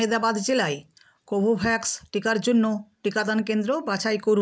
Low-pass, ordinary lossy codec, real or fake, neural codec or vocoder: none; none; real; none